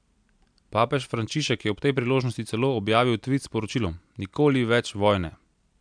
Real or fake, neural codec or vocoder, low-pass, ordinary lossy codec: real; none; 9.9 kHz; MP3, 96 kbps